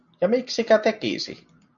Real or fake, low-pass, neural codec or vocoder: real; 7.2 kHz; none